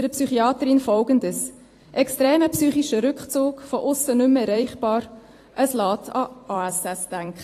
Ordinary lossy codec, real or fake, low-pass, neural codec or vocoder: AAC, 48 kbps; fake; 14.4 kHz; vocoder, 44.1 kHz, 128 mel bands every 256 samples, BigVGAN v2